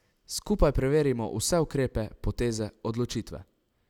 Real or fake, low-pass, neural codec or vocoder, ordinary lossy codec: real; 19.8 kHz; none; none